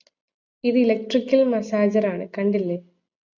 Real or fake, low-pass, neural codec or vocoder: real; 7.2 kHz; none